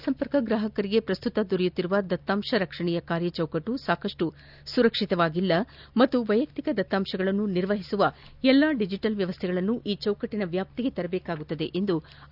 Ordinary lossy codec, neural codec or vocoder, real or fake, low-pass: none; none; real; 5.4 kHz